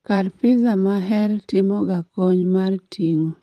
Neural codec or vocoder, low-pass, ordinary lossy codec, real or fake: vocoder, 44.1 kHz, 128 mel bands, Pupu-Vocoder; 14.4 kHz; Opus, 32 kbps; fake